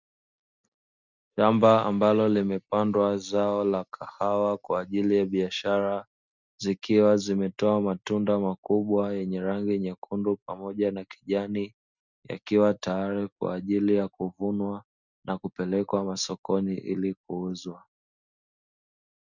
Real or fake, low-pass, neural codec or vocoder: real; 7.2 kHz; none